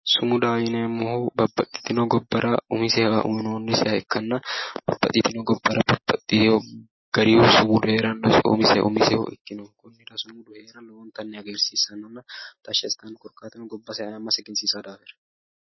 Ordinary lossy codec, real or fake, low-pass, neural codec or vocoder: MP3, 24 kbps; real; 7.2 kHz; none